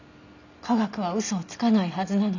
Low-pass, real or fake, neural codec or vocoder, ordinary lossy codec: 7.2 kHz; real; none; none